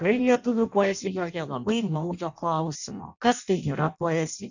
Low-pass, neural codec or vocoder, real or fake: 7.2 kHz; codec, 16 kHz in and 24 kHz out, 0.6 kbps, FireRedTTS-2 codec; fake